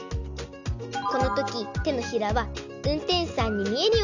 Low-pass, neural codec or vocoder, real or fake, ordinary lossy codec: 7.2 kHz; none; real; none